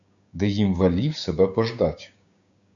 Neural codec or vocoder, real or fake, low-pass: codec, 16 kHz, 6 kbps, DAC; fake; 7.2 kHz